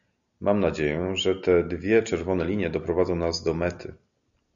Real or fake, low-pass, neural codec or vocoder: real; 7.2 kHz; none